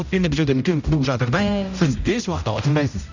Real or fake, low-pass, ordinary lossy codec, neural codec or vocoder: fake; 7.2 kHz; none; codec, 16 kHz, 0.5 kbps, X-Codec, HuBERT features, trained on general audio